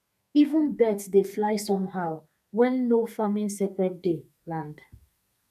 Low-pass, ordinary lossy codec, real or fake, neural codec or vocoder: 14.4 kHz; none; fake; codec, 32 kHz, 1.9 kbps, SNAC